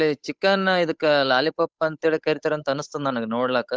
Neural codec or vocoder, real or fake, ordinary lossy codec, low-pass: codec, 16 kHz, 8 kbps, FunCodec, trained on Chinese and English, 25 frames a second; fake; none; none